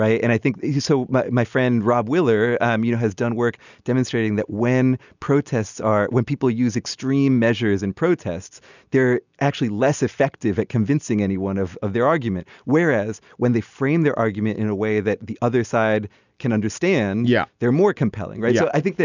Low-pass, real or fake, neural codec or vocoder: 7.2 kHz; real; none